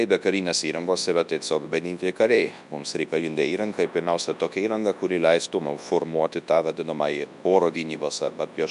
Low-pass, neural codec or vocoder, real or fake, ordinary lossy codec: 10.8 kHz; codec, 24 kHz, 0.9 kbps, WavTokenizer, large speech release; fake; MP3, 96 kbps